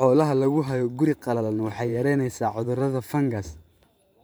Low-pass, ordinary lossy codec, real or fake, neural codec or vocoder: none; none; fake; vocoder, 44.1 kHz, 128 mel bands every 512 samples, BigVGAN v2